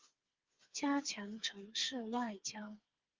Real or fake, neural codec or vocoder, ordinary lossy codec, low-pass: fake; codec, 16 kHz, 4 kbps, FreqCodec, smaller model; Opus, 24 kbps; 7.2 kHz